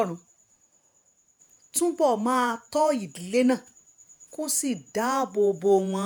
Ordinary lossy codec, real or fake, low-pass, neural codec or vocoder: none; fake; none; vocoder, 48 kHz, 128 mel bands, Vocos